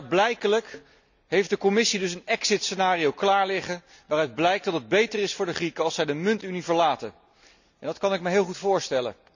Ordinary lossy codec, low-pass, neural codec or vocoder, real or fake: none; 7.2 kHz; none; real